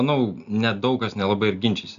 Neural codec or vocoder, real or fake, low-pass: none; real; 7.2 kHz